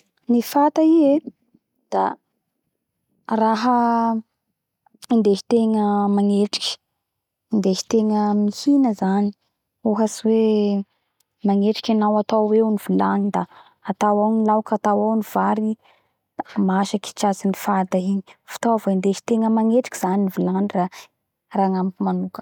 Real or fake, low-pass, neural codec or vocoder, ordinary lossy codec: real; 19.8 kHz; none; none